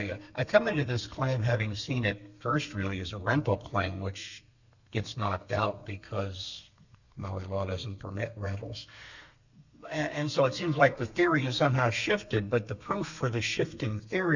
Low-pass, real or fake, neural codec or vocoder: 7.2 kHz; fake; codec, 32 kHz, 1.9 kbps, SNAC